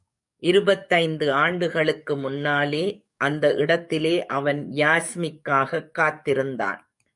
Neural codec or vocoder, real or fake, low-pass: codec, 44.1 kHz, 7.8 kbps, DAC; fake; 10.8 kHz